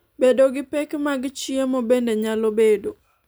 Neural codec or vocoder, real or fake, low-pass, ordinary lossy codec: none; real; none; none